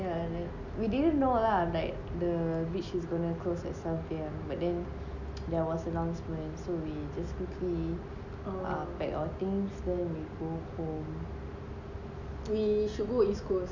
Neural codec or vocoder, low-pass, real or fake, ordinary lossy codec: none; 7.2 kHz; real; none